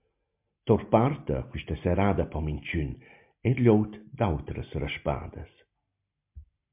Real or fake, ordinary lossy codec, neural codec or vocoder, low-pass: real; MP3, 32 kbps; none; 3.6 kHz